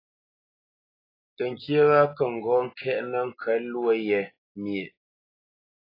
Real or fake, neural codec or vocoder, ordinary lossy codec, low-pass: real; none; AAC, 24 kbps; 5.4 kHz